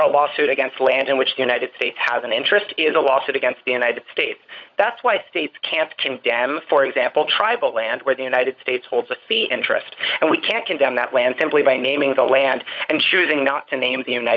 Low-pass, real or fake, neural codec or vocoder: 7.2 kHz; fake; codec, 16 kHz, 16 kbps, FunCodec, trained on LibriTTS, 50 frames a second